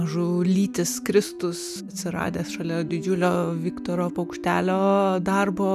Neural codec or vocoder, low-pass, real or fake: none; 14.4 kHz; real